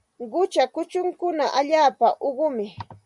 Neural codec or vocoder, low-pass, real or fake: none; 10.8 kHz; real